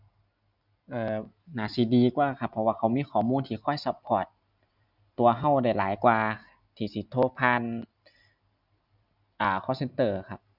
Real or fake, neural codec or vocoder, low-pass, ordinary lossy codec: real; none; 5.4 kHz; none